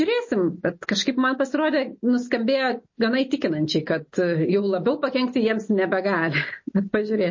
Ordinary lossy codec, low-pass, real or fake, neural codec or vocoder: MP3, 32 kbps; 7.2 kHz; real; none